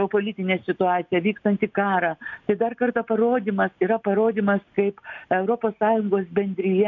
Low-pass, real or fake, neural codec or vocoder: 7.2 kHz; real; none